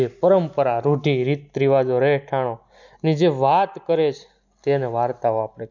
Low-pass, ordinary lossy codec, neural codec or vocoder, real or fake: 7.2 kHz; none; none; real